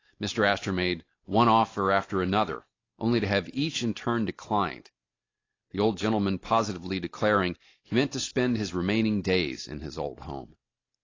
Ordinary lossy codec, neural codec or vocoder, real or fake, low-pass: AAC, 32 kbps; none; real; 7.2 kHz